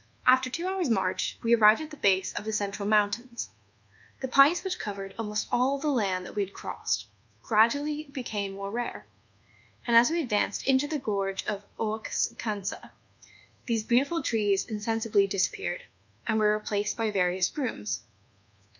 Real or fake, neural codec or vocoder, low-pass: fake; codec, 24 kHz, 1.2 kbps, DualCodec; 7.2 kHz